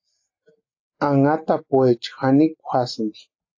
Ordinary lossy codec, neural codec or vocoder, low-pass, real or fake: AAC, 48 kbps; none; 7.2 kHz; real